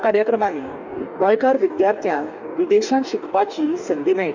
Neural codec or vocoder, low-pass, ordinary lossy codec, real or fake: codec, 44.1 kHz, 2.6 kbps, DAC; 7.2 kHz; none; fake